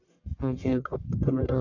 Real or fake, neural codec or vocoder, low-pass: fake; codec, 44.1 kHz, 1.7 kbps, Pupu-Codec; 7.2 kHz